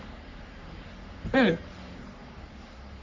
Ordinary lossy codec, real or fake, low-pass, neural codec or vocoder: MP3, 64 kbps; fake; 7.2 kHz; codec, 44.1 kHz, 3.4 kbps, Pupu-Codec